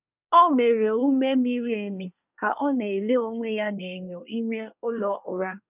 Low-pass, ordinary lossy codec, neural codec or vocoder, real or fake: 3.6 kHz; none; codec, 24 kHz, 1 kbps, SNAC; fake